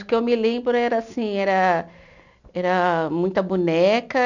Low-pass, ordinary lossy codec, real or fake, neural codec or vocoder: 7.2 kHz; none; real; none